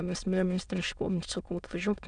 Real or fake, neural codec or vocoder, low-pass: fake; autoencoder, 22.05 kHz, a latent of 192 numbers a frame, VITS, trained on many speakers; 9.9 kHz